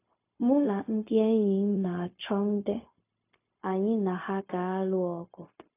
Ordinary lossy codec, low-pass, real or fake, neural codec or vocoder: AAC, 24 kbps; 3.6 kHz; fake; codec, 16 kHz, 0.4 kbps, LongCat-Audio-Codec